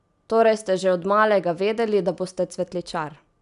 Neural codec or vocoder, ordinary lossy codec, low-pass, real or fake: none; none; 10.8 kHz; real